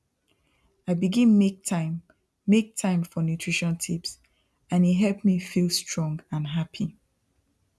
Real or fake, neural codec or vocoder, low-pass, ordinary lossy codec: real; none; none; none